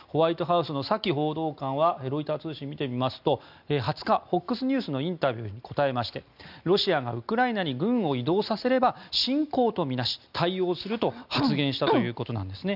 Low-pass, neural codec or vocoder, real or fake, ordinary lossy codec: 5.4 kHz; none; real; none